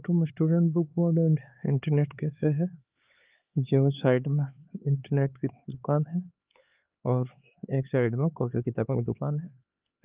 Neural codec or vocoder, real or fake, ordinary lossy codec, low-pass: codec, 16 kHz, 4 kbps, X-Codec, HuBERT features, trained on LibriSpeech; fake; Opus, 24 kbps; 3.6 kHz